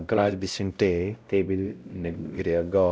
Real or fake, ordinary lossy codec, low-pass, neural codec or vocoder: fake; none; none; codec, 16 kHz, 0.5 kbps, X-Codec, WavLM features, trained on Multilingual LibriSpeech